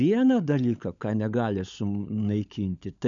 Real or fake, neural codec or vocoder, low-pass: fake; codec, 16 kHz, 16 kbps, FunCodec, trained on LibriTTS, 50 frames a second; 7.2 kHz